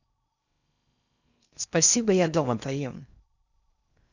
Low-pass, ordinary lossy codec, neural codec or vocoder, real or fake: 7.2 kHz; none; codec, 16 kHz in and 24 kHz out, 0.6 kbps, FocalCodec, streaming, 4096 codes; fake